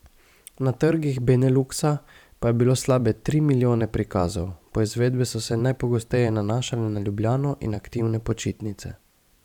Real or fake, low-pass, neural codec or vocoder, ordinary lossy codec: fake; 19.8 kHz; vocoder, 44.1 kHz, 128 mel bands every 512 samples, BigVGAN v2; none